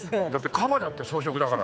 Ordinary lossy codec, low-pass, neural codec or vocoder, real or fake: none; none; codec, 16 kHz, 4 kbps, X-Codec, HuBERT features, trained on general audio; fake